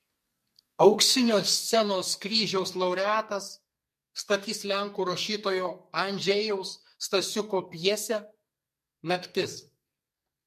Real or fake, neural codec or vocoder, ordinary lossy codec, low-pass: fake; codec, 44.1 kHz, 2.6 kbps, SNAC; MP3, 64 kbps; 14.4 kHz